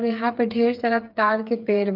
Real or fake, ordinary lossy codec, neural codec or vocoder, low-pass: fake; Opus, 24 kbps; codec, 16 kHz, 8 kbps, FreqCodec, smaller model; 5.4 kHz